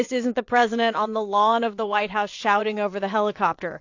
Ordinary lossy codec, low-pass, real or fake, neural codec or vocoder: AAC, 48 kbps; 7.2 kHz; fake; vocoder, 22.05 kHz, 80 mel bands, WaveNeXt